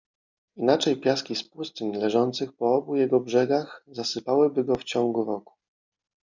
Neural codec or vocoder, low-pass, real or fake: none; 7.2 kHz; real